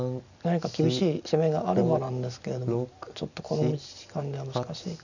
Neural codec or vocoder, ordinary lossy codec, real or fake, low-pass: none; none; real; 7.2 kHz